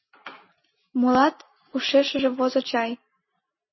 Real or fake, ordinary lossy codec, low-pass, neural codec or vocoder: real; MP3, 24 kbps; 7.2 kHz; none